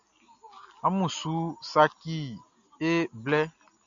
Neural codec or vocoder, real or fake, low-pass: none; real; 7.2 kHz